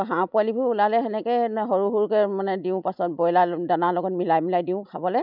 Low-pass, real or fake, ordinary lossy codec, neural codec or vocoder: 5.4 kHz; real; none; none